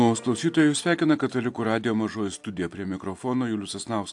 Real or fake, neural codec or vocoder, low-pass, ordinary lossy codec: real; none; 10.8 kHz; AAC, 64 kbps